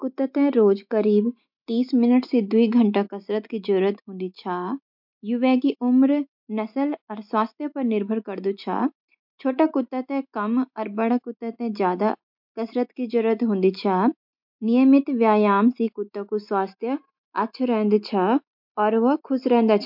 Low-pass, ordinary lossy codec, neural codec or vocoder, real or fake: 5.4 kHz; MP3, 48 kbps; none; real